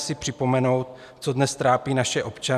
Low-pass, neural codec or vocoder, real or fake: 14.4 kHz; none; real